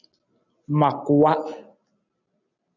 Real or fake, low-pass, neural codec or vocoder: real; 7.2 kHz; none